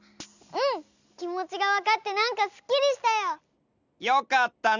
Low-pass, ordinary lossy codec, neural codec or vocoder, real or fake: 7.2 kHz; none; none; real